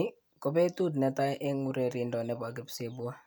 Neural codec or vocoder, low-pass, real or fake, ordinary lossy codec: none; none; real; none